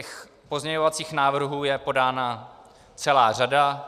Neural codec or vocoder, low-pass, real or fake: none; 14.4 kHz; real